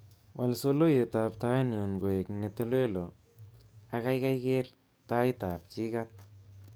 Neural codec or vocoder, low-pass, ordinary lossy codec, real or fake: codec, 44.1 kHz, 7.8 kbps, DAC; none; none; fake